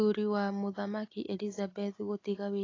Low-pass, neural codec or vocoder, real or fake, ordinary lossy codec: 7.2 kHz; none; real; AAC, 32 kbps